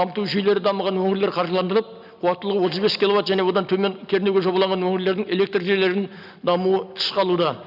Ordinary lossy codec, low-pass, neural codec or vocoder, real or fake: none; 5.4 kHz; none; real